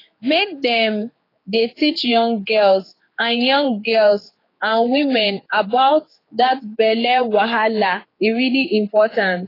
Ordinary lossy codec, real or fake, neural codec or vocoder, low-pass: AAC, 24 kbps; fake; codec, 16 kHz, 4 kbps, X-Codec, HuBERT features, trained on general audio; 5.4 kHz